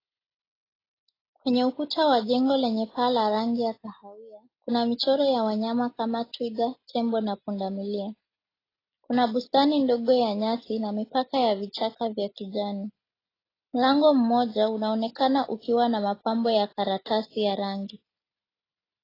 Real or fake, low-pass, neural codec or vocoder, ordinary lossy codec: real; 5.4 kHz; none; AAC, 24 kbps